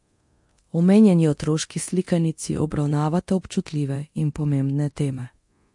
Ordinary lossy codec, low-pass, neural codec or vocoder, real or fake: MP3, 48 kbps; 10.8 kHz; codec, 24 kHz, 0.9 kbps, DualCodec; fake